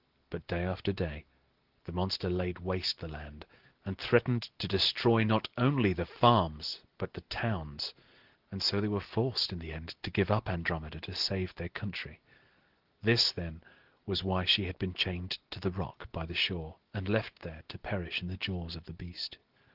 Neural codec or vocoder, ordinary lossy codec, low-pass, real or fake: none; Opus, 16 kbps; 5.4 kHz; real